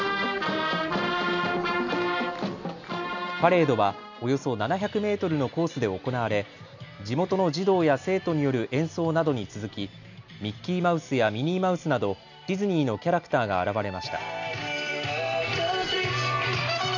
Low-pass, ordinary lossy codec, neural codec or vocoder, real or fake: 7.2 kHz; none; none; real